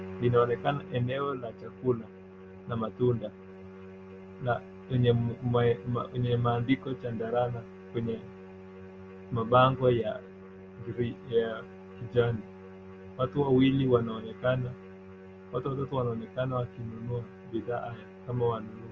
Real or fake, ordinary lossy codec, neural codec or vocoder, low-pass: real; Opus, 24 kbps; none; 7.2 kHz